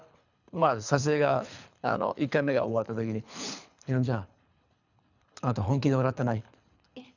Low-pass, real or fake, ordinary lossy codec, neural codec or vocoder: 7.2 kHz; fake; none; codec, 24 kHz, 3 kbps, HILCodec